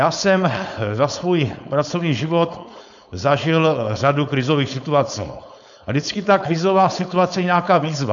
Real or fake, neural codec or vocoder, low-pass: fake; codec, 16 kHz, 4.8 kbps, FACodec; 7.2 kHz